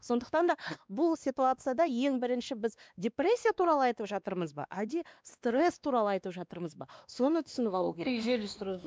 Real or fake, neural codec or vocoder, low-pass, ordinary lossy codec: fake; codec, 16 kHz, 2 kbps, X-Codec, WavLM features, trained on Multilingual LibriSpeech; none; none